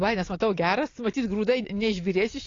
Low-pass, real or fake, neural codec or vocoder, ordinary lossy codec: 7.2 kHz; real; none; AAC, 32 kbps